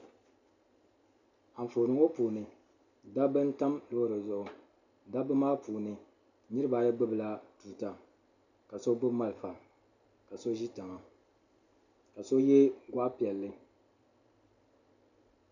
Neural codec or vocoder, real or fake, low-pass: none; real; 7.2 kHz